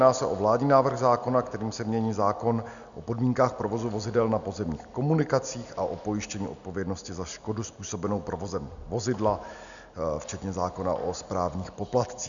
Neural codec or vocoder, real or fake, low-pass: none; real; 7.2 kHz